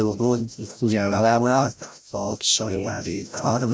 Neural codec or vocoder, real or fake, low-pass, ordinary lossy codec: codec, 16 kHz, 0.5 kbps, FreqCodec, larger model; fake; none; none